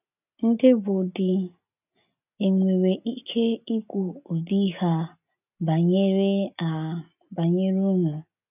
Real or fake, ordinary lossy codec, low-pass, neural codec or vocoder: real; none; 3.6 kHz; none